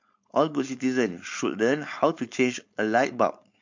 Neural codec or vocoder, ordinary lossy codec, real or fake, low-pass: codec, 16 kHz, 4.8 kbps, FACodec; MP3, 48 kbps; fake; 7.2 kHz